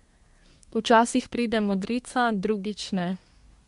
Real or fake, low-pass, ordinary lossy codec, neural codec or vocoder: fake; 10.8 kHz; MP3, 64 kbps; codec, 24 kHz, 1 kbps, SNAC